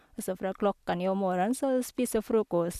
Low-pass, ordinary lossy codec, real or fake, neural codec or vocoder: 14.4 kHz; none; real; none